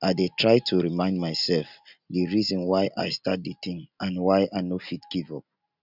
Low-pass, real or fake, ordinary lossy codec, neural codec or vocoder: 5.4 kHz; real; none; none